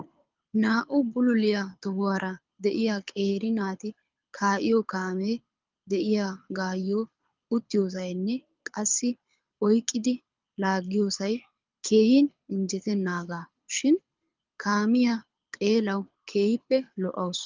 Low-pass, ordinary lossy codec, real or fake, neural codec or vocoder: 7.2 kHz; Opus, 24 kbps; fake; codec, 24 kHz, 6 kbps, HILCodec